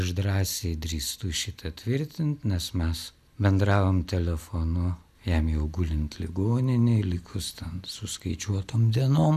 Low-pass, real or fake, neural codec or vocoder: 14.4 kHz; real; none